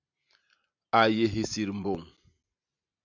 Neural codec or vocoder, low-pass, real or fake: none; 7.2 kHz; real